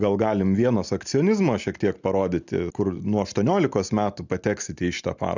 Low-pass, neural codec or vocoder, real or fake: 7.2 kHz; none; real